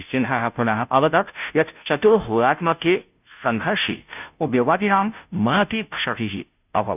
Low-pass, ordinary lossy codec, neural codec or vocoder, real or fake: 3.6 kHz; none; codec, 16 kHz, 0.5 kbps, FunCodec, trained on Chinese and English, 25 frames a second; fake